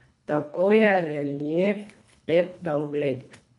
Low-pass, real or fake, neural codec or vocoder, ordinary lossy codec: 10.8 kHz; fake; codec, 24 kHz, 1.5 kbps, HILCodec; MP3, 96 kbps